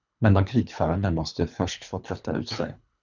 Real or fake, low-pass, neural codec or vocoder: fake; 7.2 kHz; codec, 24 kHz, 3 kbps, HILCodec